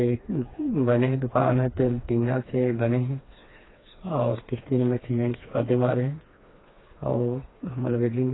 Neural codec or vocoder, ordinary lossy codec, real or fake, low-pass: codec, 16 kHz, 2 kbps, FreqCodec, smaller model; AAC, 16 kbps; fake; 7.2 kHz